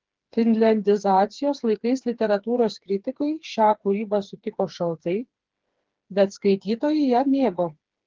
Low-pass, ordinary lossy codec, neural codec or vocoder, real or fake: 7.2 kHz; Opus, 16 kbps; codec, 16 kHz, 4 kbps, FreqCodec, smaller model; fake